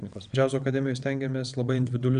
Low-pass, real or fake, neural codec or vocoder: 9.9 kHz; fake; vocoder, 22.05 kHz, 80 mel bands, WaveNeXt